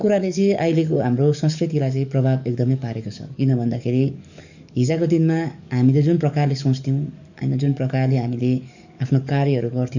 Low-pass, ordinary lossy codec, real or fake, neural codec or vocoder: 7.2 kHz; none; fake; codec, 44.1 kHz, 7.8 kbps, DAC